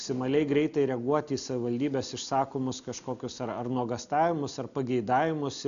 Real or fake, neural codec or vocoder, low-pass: real; none; 7.2 kHz